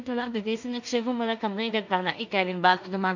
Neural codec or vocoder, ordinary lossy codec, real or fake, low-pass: codec, 16 kHz in and 24 kHz out, 0.4 kbps, LongCat-Audio-Codec, two codebook decoder; none; fake; 7.2 kHz